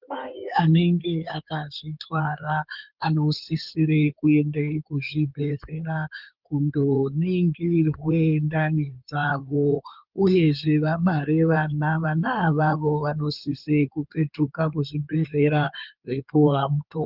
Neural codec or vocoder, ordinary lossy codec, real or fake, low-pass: codec, 16 kHz in and 24 kHz out, 2.2 kbps, FireRedTTS-2 codec; Opus, 32 kbps; fake; 5.4 kHz